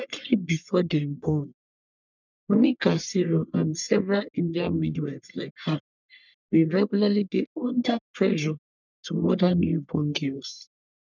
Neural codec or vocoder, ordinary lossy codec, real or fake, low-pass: codec, 44.1 kHz, 1.7 kbps, Pupu-Codec; none; fake; 7.2 kHz